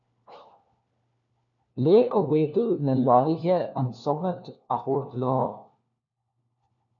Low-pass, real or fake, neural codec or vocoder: 7.2 kHz; fake; codec, 16 kHz, 1 kbps, FunCodec, trained on LibriTTS, 50 frames a second